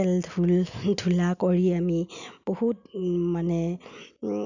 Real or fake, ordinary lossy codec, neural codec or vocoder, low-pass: real; none; none; 7.2 kHz